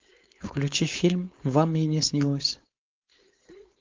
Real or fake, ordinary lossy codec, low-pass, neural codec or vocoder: fake; Opus, 24 kbps; 7.2 kHz; codec, 16 kHz, 4.8 kbps, FACodec